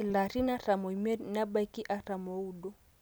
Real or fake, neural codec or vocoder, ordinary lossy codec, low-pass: real; none; none; none